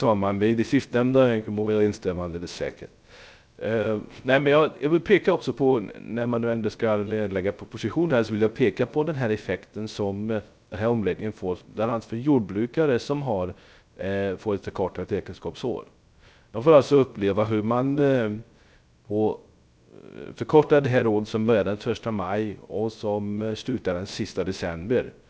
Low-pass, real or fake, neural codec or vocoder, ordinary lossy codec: none; fake; codec, 16 kHz, 0.3 kbps, FocalCodec; none